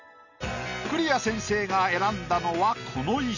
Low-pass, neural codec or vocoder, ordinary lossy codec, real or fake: 7.2 kHz; none; none; real